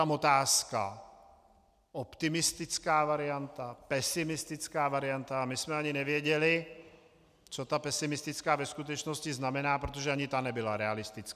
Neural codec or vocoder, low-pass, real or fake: none; 14.4 kHz; real